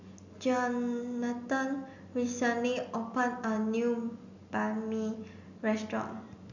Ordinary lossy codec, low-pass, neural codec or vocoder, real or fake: none; 7.2 kHz; none; real